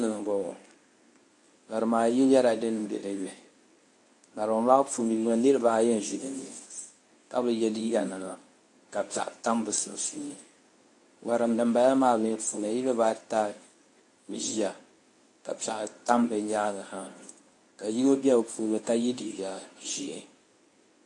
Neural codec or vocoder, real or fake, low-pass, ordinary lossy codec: codec, 24 kHz, 0.9 kbps, WavTokenizer, medium speech release version 1; fake; 10.8 kHz; AAC, 48 kbps